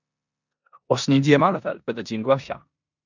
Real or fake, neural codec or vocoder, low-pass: fake; codec, 16 kHz in and 24 kHz out, 0.9 kbps, LongCat-Audio-Codec, four codebook decoder; 7.2 kHz